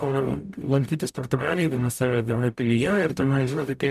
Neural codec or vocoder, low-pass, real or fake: codec, 44.1 kHz, 0.9 kbps, DAC; 14.4 kHz; fake